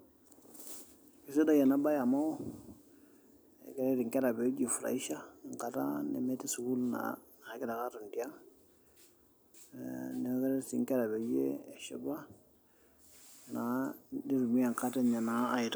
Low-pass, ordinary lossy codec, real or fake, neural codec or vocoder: none; none; real; none